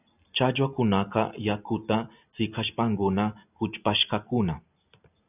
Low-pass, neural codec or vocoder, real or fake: 3.6 kHz; none; real